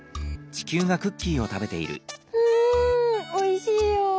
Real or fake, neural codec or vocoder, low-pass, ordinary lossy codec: real; none; none; none